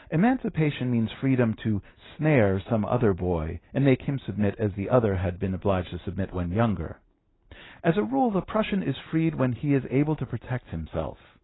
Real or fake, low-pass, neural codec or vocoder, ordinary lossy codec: real; 7.2 kHz; none; AAC, 16 kbps